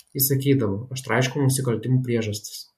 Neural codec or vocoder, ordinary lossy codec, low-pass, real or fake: none; MP3, 64 kbps; 14.4 kHz; real